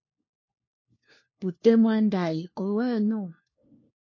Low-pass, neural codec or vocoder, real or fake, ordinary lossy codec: 7.2 kHz; codec, 16 kHz, 1 kbps, FunCodec, trained on LibriTTS, 50 frames a second; fake; MP3, 32 kbps